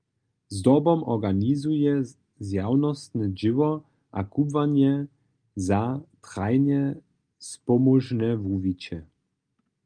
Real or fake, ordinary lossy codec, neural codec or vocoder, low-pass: real; Opus, 32 kbps; none; 9.9 kHz